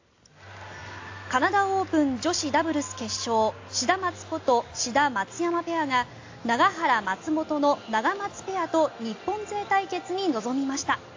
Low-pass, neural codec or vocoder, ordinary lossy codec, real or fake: 7.2 kHz; none; AAC, 48 kbps; real